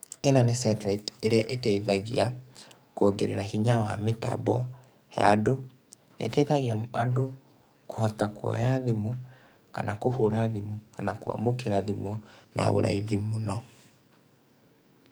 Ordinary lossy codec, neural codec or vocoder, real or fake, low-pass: none; codec, 44.1 kHz, 2.6 kbps, SNAC; fake; none